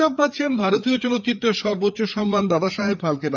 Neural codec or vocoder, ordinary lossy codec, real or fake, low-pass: codec, 16 kHz, 4 kbps, FreqCodec, larger model; none; fake; 7.2 kHz